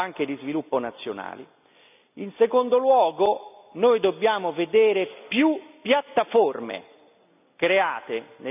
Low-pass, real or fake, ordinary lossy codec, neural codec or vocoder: 3.6 kHz; real; none; none